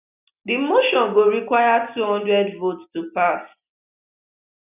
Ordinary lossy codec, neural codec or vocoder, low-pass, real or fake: none; none; 3.6 kHz; real